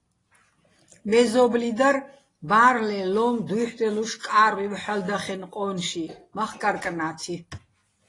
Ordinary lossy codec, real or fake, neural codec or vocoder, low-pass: AAC, 32 kbps; real; none; 10.8 kHz